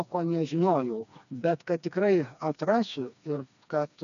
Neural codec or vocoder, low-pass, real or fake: codec, 16 kHz, 2 kbps, FreqCodec, smaller model; 7.2 kHz; fake